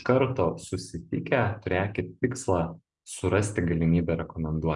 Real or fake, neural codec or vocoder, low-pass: real; none; 10.8 kHz